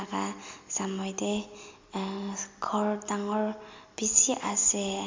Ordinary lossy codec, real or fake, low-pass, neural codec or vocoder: MP3, 64 kbps; real; 7.2 kHz; none